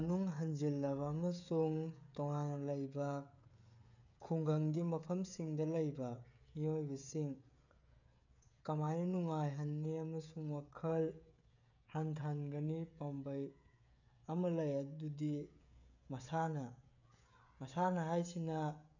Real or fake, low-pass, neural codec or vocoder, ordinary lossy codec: fake; 7.2 kHz; codec, 16 kHz, 16 kbps, FreqCodec, smaller model; none